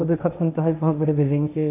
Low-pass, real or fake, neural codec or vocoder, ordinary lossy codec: 3.6 kHz; fake; codec, 16 kHz, 1.1 kbps, Voila-Tokenizer; AAC, 24 kbps